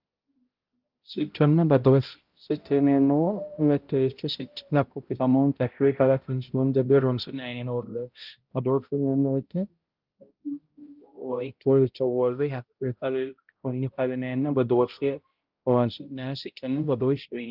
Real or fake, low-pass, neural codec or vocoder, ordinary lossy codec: fake; 5.4 kHz; codec, 16 kHz, 0.5 kbps, X-Codec, HuBERT features, trained on balanced general audio; Opus, 32 kbps